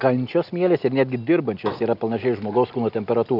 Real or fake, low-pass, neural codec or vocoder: real; 5.4 kHz; none